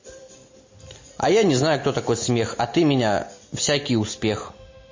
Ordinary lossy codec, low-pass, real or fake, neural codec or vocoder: MP3, 32 kbps; 7.2 kHz; real; none